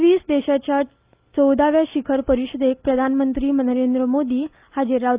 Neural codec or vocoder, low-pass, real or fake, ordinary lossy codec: none; 3.6 kHz; real; Opus, 32 kbps